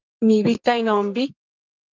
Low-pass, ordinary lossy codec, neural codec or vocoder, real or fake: 7.2 kHz; Opus, 24 kbps; codec, 44.1 kHz, 2.6 kbps, SNAC; fake